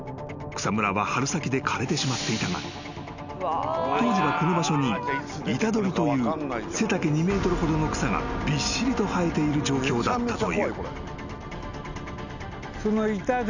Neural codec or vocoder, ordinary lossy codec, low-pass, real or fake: none; none; 7.2 kHz; real